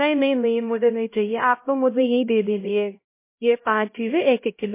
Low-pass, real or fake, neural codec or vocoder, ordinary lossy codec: 3.6 kHz; fake; codec, 16 kHz, 0.5 kbps, X-Codec, HuBERT features, trained on LibriSpeech; MP3, 24 kbps